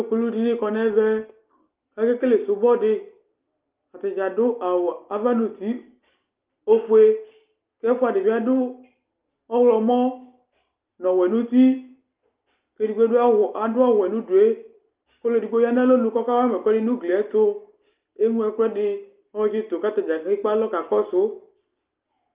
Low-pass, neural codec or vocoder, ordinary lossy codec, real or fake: 3.6 kHz; none; Opus, 32 kbps; real